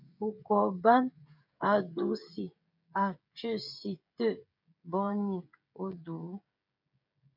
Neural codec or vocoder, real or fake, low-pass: codec, 16 kHz, 16 kbps, FreqCodec, smaller model; fake; 5.4 kHz